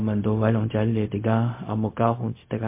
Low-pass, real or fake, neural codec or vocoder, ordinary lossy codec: 3.6 kHz; fake; codec, 16 kHz, 0.4 kbps, LongCat-Audio-Codec; MP3, 24 kbps